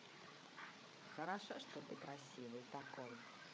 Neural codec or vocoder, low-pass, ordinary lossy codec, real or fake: codec, 16 kHz, 8 kbps, FreqCodec, larger model; none; none; fake